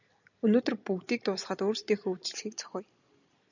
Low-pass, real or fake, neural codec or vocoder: 7.2 kHz; real; none